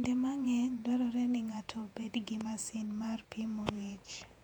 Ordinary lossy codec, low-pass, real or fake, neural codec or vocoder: none; 19.8 kHz; fake; vocoder, 48 kHz, 128 mel bands, Vocos